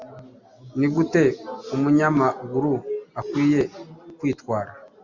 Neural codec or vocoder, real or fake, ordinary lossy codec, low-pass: none; real; Opus, 64 kbps; 7.2 kHz